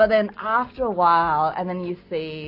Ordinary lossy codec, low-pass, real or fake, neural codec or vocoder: AAC, 32 kbps; 5.4 kHz; real; none